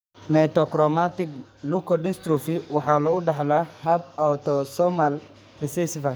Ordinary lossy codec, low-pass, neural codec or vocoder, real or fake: none; none; codec, 44.1 kHz, 2.6 kbps, SNAC; fake